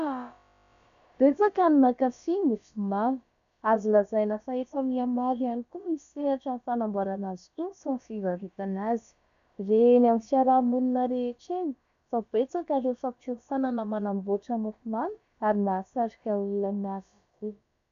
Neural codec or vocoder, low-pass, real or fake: codec, 16 kHz, about 1 kbps, DyCAST, with the encoder's durations; 7.2 kHz; fake